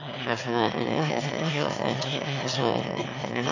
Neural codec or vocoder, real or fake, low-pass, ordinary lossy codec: autoencoder, 22.05 kHz, a latent of 192 numbers a frame, VITS, trained on one speaker; fake; 7.2 kHz; none